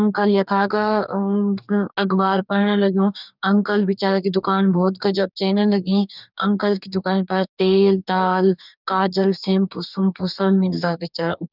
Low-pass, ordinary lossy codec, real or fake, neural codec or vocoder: 5.4 kHz; none; fake; codec, 44.1 kHz, 2.6 kbps, DAC